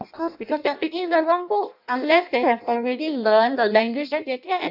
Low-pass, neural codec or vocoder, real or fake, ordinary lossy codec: 5.4 kHz; codec, 16 kHz in and 24 kHz out, 0.6 kbps, FireRedTTS-2 codec; fake; none